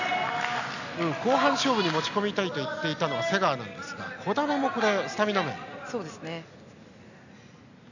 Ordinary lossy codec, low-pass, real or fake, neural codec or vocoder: none; 7.2 kHz; real; none